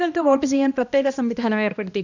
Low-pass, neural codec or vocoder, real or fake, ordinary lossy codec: 7.2 kHz; codec, 16 kHz, 1 kbps, X-Codec, HuBERT features, trained on balanced general audio; fake; none